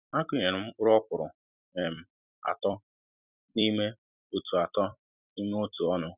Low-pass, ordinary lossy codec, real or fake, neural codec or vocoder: 3.6 kHz; none; fake; vocoder, 44.1 kHz, 128 mel bands every 256 samples, BigVGAN v2